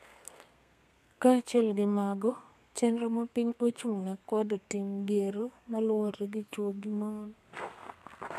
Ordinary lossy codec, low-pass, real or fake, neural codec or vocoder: none; 14.4 kHz; fake; codec, 32 kHz, 1.9 kbps, SNAC